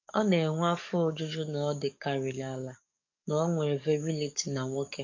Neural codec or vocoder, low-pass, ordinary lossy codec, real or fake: codec, 44.1 kHz, 7.8 kbps, DAC; 7.2 kHz; MP3, 48 kbps; fake